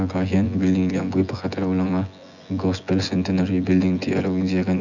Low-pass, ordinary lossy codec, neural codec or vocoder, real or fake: 7.2 kHz; none; vocoder, 24 kHz, 100 mel bands, Vocos; fake